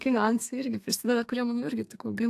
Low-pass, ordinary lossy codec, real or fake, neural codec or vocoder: 14.4 kHz; AAC, 64 kbps; fake; codec, 32 kHz, 1.9 kbps, SNAC